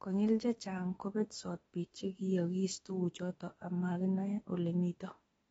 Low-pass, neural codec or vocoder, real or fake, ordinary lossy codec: 19.8 kHz; autoencoder, 48 kHz, 32 numbers a frame, DAC-VAE, trained on Japanese speech; fake; AAC, 24 kbps